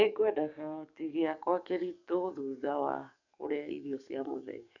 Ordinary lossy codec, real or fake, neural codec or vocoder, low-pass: none; fake; codec, 44.1 kHz, 7.8 kbps, DAC; 7.2 kHz